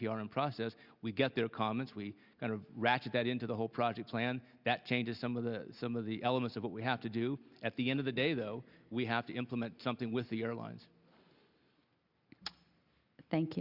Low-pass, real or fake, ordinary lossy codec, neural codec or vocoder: 5.4 kHz; real; Opus, 64 kbps; none